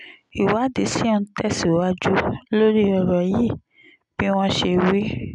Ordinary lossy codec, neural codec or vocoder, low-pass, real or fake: none; none; 10.8 kHz; real